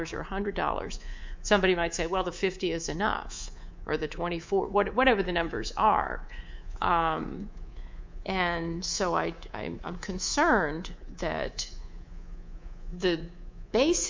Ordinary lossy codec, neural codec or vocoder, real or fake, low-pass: MP3, 64 kbps; codec, 24 kHz, 3.1 kbps, DualCodec; fake; 7.2 kHz